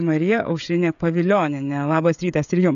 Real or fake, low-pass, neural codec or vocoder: fake; 7.2 kHz; codec, 16 kHz, 16 kbps, FreqCodec, smaller model